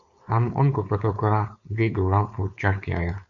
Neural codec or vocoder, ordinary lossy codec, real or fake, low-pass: codec, 16 kHz, 4.8 kbps, FACodec; MP3, 96 kbps; fake; 7.2 kHz